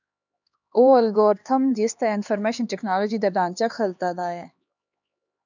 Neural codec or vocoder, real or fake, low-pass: codec, 16 kHz, 2 kbps, X-Codec, HuBERT features, trained on LibriSpeech; fake; 7.2 kHz